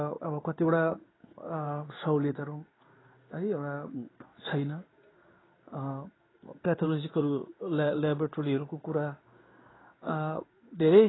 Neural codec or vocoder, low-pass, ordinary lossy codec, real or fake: codec, 16 kHz in and 24 kHz out, 1 kbps, XY-Tokenizer; 7.2 kHz; AAC, 16 kbps; fake